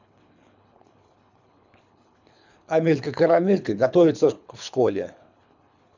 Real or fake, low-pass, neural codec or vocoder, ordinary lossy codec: fake; 7.2 kHz; codec, 24 kHz, 3 kbps, HILCodec; none